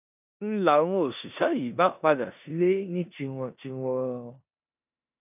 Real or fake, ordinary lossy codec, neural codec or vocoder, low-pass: fake; none; codec, 16 kHz in and 24 kHz out, 0.9 kbps, LongCat-Audio-Codec, four codebook decoder; 3.6 kHz